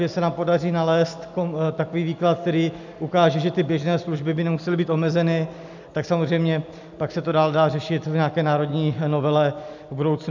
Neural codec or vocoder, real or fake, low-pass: none; real; 7.2 kHz